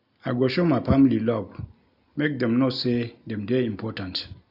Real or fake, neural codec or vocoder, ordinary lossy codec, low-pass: real; none; none; 5.4 kHz